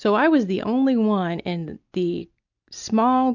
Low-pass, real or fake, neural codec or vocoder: 7.2 kHz; real; none